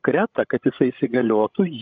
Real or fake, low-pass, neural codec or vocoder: fake; 7.2 kHz; codec, 16 kHz, 16 kbps, FreqCodec, larger model